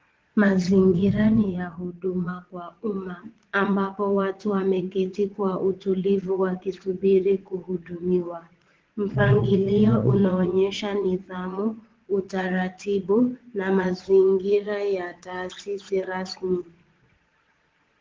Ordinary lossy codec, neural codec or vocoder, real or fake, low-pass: Opus, 16 kbps; vocoder, 22.05 kHz, 80 mel bands, Vocos; fake; 7.2 kHz